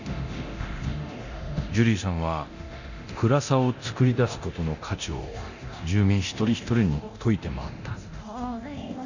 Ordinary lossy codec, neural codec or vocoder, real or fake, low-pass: none; codec, 24 kHz, 0.9 kbps, DualCodec; fake; 7.2 kHz